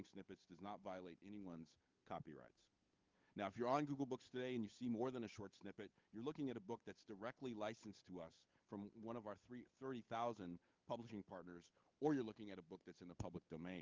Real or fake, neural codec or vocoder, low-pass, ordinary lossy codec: fake; codec, 16 kHz, 8 kbps, FunCodec, trained on Chinese and English, 25 frames a second; 7.2 kHz; Opus, 32 kbps